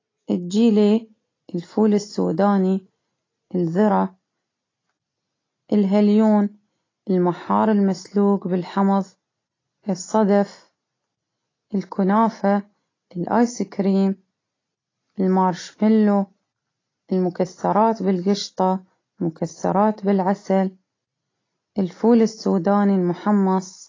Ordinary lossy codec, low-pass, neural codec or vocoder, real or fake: AAC, 32 kbps; 7.2 kHz; none; real